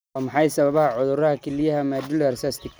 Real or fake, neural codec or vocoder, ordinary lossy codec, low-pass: real; none; none; none